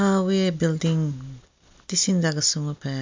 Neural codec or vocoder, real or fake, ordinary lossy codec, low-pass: none; real; MP3, 64 kbps; 7.2 kHz